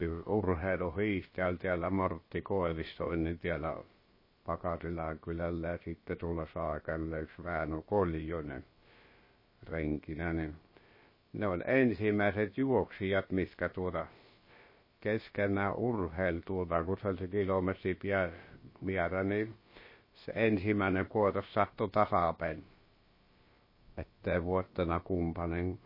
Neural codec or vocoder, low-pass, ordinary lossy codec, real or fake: codec, 16 kHz, about 1 kbps, DyCAST, with the encoder's durations; 5.4 kHz; MP3, 24 kbps; fake